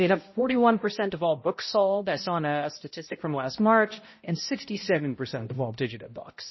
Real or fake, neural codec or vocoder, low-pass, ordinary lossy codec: fake; codec, 16 kHz, 0.5 kbps, X-Codec, HuBERT features, trained on balanced general audio; 7.2 kHz; MP3, 24 kbps